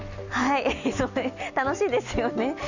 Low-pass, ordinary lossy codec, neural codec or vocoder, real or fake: 7.2 kHz; none; none; real